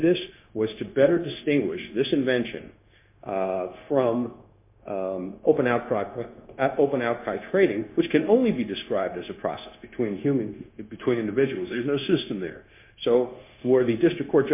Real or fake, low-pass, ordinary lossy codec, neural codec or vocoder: fake; 3.6 kHz; MP3, 24 kbps; codec, 16 kHz, 0.9 kbps, LongCat-Audio-Codec